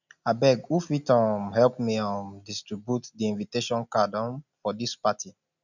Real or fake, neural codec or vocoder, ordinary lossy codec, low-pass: real; none; none; 7.2 kHz